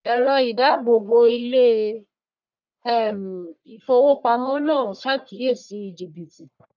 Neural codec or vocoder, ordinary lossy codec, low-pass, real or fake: codec, 44.1 kHz, 1.7 kbps, Pupu-Codec; none; 7.2 kHz; fake